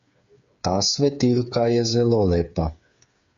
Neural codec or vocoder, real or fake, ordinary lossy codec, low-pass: codec, 16 kHz, 8 kbps, FreqCodec, smaller model; fake; AAC, 64 kbps; 7.2 kHz